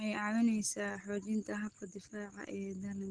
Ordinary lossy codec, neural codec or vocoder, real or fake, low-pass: Opus, 16 kbps; none; real; 9.9 kHz